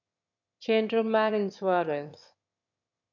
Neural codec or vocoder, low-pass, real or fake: autoencoder, 22.05 kHz, a latent of 192 numbers a frame, VITS, trained on one speaker; 7.2 kHz; fake